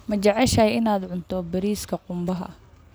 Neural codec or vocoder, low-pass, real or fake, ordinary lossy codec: none; none; real; none